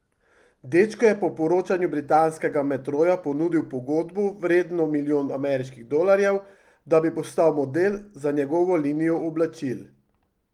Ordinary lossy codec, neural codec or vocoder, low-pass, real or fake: Opus, 24 kbps; none; 19.8 kHz; real